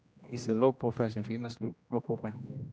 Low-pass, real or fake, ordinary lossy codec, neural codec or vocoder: none; fake; none; codec, 16 kHz, 1 kbps, X-Codec, HuBERT features, trained on general audio